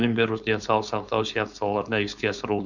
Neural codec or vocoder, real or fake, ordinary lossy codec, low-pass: codec, 16 kHz, 4.8 kbps, FACodec; fake; none; 7.2 kHz